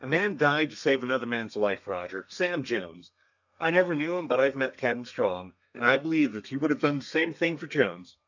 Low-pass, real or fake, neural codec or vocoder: 7.2 kHz; fake; codec, 32 kHz, 1.9 kbps, SNAC